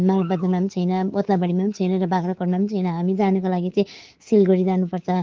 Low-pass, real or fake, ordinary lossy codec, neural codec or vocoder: 7.2 kHz; fake; Opus, 16 kbps; codec, 24 kHz, 3.1 kbps, DualCodec